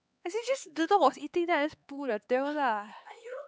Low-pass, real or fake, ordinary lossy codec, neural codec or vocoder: none; fake; none; codec, 16 kHz, 4 kbps, X-Codec, HuBERT features, trained on LibriSpeech